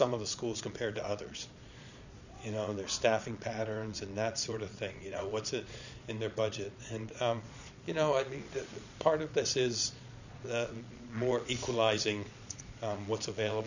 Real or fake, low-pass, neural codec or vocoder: fake; 7.2 kHz; vocoder, 22.05 kHz, 80 mel bands, Vocos